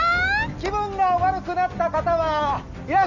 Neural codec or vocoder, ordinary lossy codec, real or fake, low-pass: none; none; real; 7.2 kHz